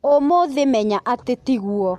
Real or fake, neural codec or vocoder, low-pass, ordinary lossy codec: real; none; 14.4 kHz; MP3, 64 kbps